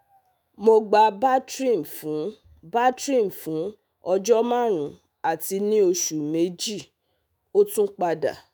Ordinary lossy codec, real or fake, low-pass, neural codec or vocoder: none; fake; none; autoencoder, 48 kHz, 128 numbers a frame, DAC-VAE, trained on Japanese speech